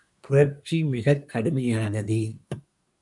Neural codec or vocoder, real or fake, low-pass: codec, 24 kHz, 1 kbps, SNAC; fake; 10.8 kHz